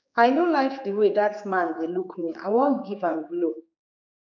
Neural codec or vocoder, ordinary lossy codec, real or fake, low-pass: codec, 16 kHz, 4 kbps, X-Codec, HuBERT features, trained on general audio; none; fake; 7.2 kHz